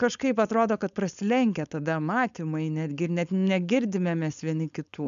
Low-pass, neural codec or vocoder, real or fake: 7.2 kHz; codec, 16 kHz, 4.8 kbps, FACodec; fake